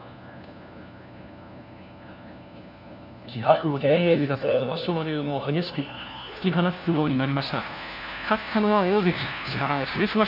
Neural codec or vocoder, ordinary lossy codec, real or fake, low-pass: codec, 16 kHz, 1 kbps, FunCodec, trained on LibriTTS, 50 frames a second; none; fake; 5.4 kHz